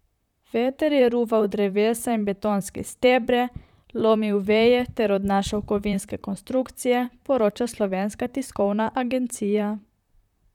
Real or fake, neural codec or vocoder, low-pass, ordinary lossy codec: fake; vocoder, 44.1 kHz, 128 mel bands, Pupu-Vocoder; 19.8 kHz; none